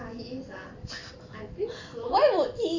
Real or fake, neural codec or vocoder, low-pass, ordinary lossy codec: real; none; 7.2 kHz; MP3, 64 kbps